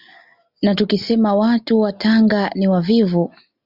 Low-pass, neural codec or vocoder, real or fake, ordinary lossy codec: 5.4 kHz; none; real; Opus, 64 kbps